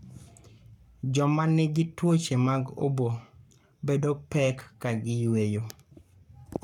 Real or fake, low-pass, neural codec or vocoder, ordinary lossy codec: fake; 19.8 kHz; codec, 44.1 kHz, 7.8 kbps, Pupu-Codec; none